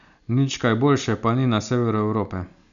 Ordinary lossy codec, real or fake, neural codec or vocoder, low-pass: none; real; none; 7.2 kHz